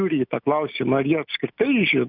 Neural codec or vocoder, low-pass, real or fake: none; 5.4 kHz; real